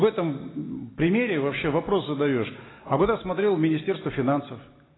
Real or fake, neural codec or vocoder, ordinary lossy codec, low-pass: real; none; AAC, 16 kbps; 7.2 kHz